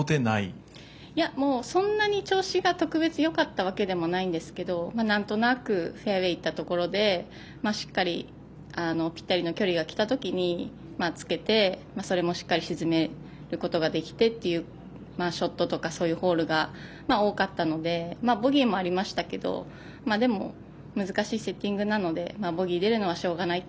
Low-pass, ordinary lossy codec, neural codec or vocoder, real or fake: none; none; none; real